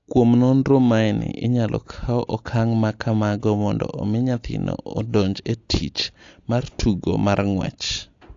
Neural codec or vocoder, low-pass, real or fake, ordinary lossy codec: none; 7.2 kHz; real; AAC, 48 kbps